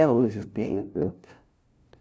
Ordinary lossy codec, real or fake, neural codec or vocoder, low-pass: none; fake; codec, 16 kHz, 0.5 kbps, FunCodec, trained on LibriTTS, 25 frames a second; none